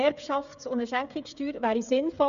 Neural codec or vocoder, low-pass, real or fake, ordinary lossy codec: codec, 16 kHz, 16 kbps, FreqCodec, smaller model; 7.2 kHz; fake; none